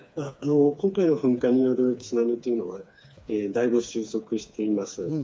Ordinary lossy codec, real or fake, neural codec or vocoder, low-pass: none; fake; codec, 16 kHz, 4 kbps, FreqCodec, smaller model; none